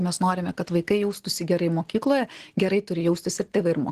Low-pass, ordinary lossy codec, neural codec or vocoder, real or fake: 14.4 kHz; Opus, 16 kbps; none; real